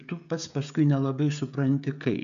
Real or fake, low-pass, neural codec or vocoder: fake; 7.2 kHz; codec, 16 kHz, 16 kbps, FreqCodec, smaller model